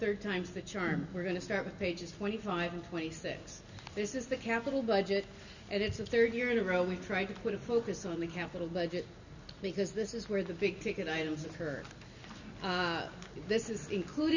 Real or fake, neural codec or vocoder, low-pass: real; none; 7.2 kHz